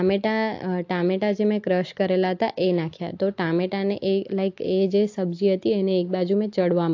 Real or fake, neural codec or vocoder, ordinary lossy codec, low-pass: real; none; AAC, 48 kbps; 7.2 kHz